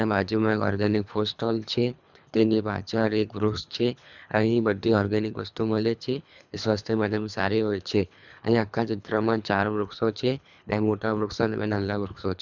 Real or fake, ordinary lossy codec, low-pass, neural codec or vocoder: fake; none; 7.2 kHz; codec, 24 kHz, 3 kbps, HILCodec